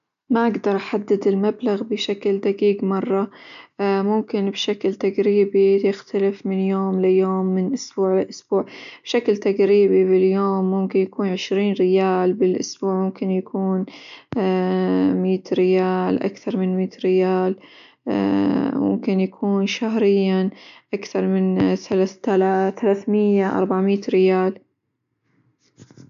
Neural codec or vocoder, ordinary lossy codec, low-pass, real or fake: none; none; 7.2 kHz; real